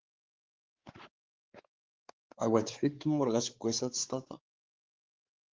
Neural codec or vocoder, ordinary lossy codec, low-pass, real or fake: codec, 16 kHz, 4 kbps, X-Codec, WavLM features, trained on Multilingual LibriSpeech; Opus, 16 kbps; 7.2 kHz; fake